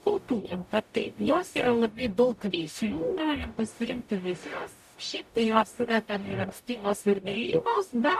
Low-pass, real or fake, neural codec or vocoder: 14.4 kHz; fake; codec, 44.1 kHz, 0.9 kbps, DAC